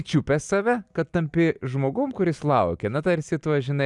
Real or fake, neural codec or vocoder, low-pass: real; none; 10.8 kHz